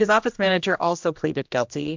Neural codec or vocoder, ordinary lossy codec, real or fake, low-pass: codec, 16 kHz, 1 kbps, X-Codec, HuBERT features, trained on general audio; MP3, 64 kbps; fake; 7.2 kHz